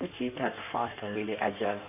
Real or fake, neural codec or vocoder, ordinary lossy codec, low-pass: fake; codec, 16 kHz in and 24 kHz out, 1.1 kbps, FireRedTTS-2 codec; none; 3.6 kHz